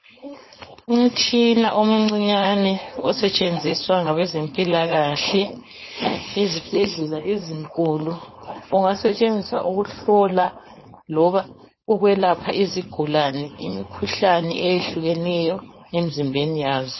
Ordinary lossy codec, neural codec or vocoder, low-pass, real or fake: MP3, 24 kbps; codec, 16 kHz, 4.8 kbps, FACodec; 7.2 kHz; fake